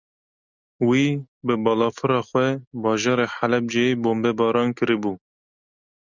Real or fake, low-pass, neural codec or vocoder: real; 7.2 kHz; none